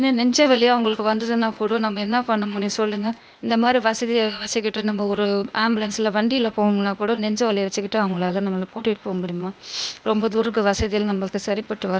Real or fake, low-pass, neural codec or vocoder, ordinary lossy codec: fake; none; codec, 16 kHz, 0.8 kbps, ZipCodec; none